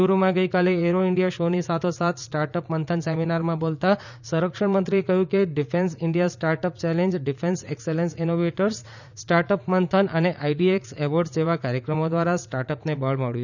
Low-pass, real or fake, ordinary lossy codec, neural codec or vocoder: 7.2 kHz; fake; none; vocoder, 44.1 kHz, 80 mel bands, Vocos